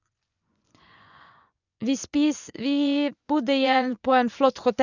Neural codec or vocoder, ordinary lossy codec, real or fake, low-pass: vocoder, 24 kHz, 100 mel bands, Vocos; none; fake; 7.2 kHz